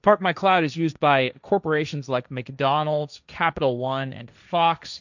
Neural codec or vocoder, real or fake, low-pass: codec, 16 kHz, 1.1 kbps, Voila-Tokenizer; fake; 7.2 kHz